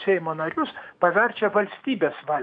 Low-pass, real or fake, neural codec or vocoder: 9.9 kHz; fake; autoencoder, 48 kHz, 128 numbers a frame, DAC-VAE, trained on Japanese speech